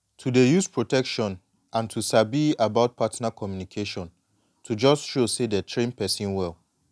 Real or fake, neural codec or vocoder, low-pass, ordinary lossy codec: real; none; none; none